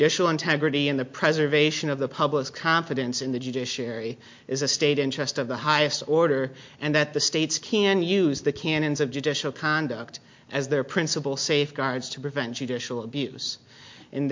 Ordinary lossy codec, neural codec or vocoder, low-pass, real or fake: MP3, 48 kbps; none; 7.2 kHz; real